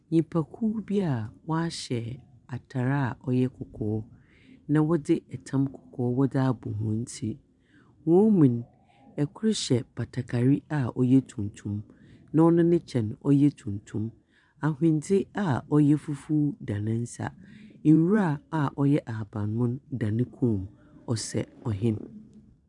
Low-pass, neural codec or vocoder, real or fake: 10.8 kHz; vocoder, 44.1 kHz, 128 mel bands every 512 samples, BigVGAN v2; fake